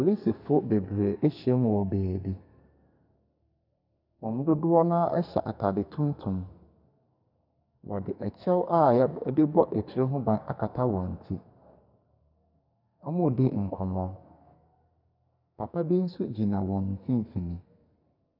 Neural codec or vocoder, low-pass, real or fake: codec, 44.1 kHz, 2.6 kbps, SNAC; 5.4 kHz; fake